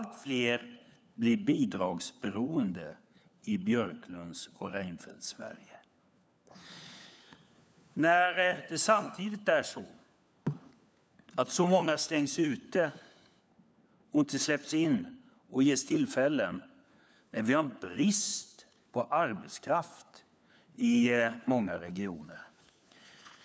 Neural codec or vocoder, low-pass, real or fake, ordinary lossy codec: codec, 16 kHz, 4 kbps, FunCodec, trained on LibriTTS, 50 frames a second; none; fake; none